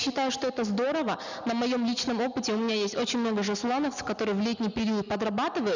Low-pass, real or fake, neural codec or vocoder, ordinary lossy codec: 7.2 kHz; real; none; none